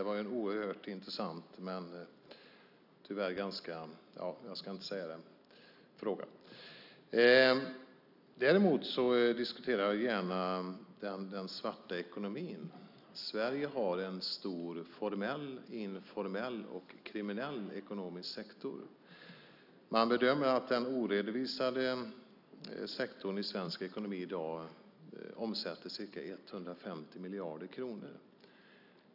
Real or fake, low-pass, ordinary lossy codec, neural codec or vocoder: real; 5.4 kHz; none; none